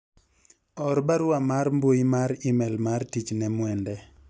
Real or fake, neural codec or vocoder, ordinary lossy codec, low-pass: real; none; none; none